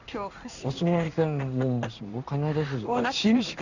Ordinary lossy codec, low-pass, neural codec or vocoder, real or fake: none; 7.2 kHz; codec, 16 kHz in and 24 kHz out, 1 kbps, XY-Tokenizer; fake